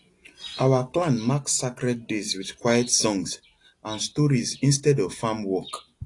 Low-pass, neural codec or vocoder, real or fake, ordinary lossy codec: 10.8 kHz; none; real; AAC, 48 kbps